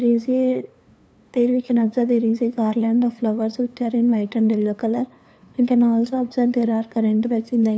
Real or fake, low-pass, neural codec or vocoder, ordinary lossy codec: fake; none; codec, 16 kHz, 2 kbps, FunCodec, trained on LibriTTS, 25 frames a second; none